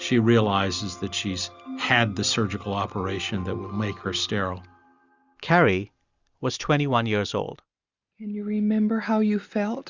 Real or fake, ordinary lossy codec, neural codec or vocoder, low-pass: real; Opus, 64 kbps; none; 7.2 kHz